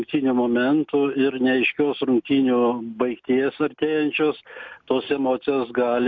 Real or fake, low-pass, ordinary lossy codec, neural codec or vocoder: real; 7.2 kHz; MP3, 64 kbps; none